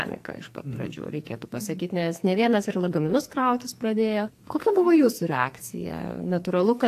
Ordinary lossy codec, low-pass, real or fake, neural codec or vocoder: AAC, 64 kbps; 14.4 kHz; fake; codec, 44.1 kHz, 2.6 kbps, SNAC